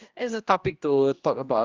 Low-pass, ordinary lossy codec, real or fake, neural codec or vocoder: 7.2 kHz; Opus, 32 kbps; fake; codec, 16 kHz, 1 kbps, X-Codec, HuBERT features, trained on general audio